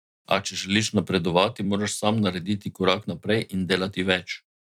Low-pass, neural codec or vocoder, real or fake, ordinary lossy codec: 19.8 kHz; vocoder, 44.1 kHz, 128 mel bands every 256 samples, BigVGAN v2; fake; none